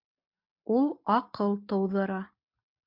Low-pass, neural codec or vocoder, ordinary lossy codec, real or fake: 5.4 kHz; none; MP3, 48 kbps; real